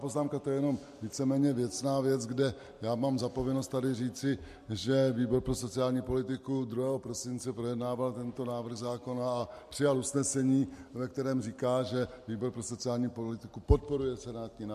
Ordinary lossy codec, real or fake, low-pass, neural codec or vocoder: MP3, 64 kbps; real; 14.4 kHz; none